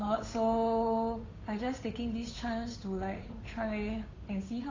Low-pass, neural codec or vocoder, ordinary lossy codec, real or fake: 7.2 kHz; codec, 16 kHz, 8 kbps, FunCodec, trained on Chinese and English, 25 frames a second; AAC, 32 kbps; fake